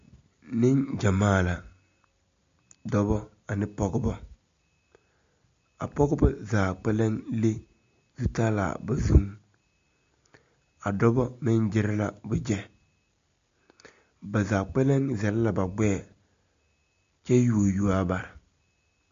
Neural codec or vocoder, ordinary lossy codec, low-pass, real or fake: none; MP3, 48 kbps; 7.2 kHz; real